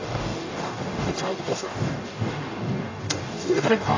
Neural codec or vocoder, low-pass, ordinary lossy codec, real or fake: codec, 44.1 kHz, 0.9 kbps, DAC; 7.2 kHz; AAC, 32 kbps; fake